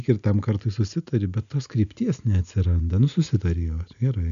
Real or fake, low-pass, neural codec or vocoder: real; 7.2 kHz; none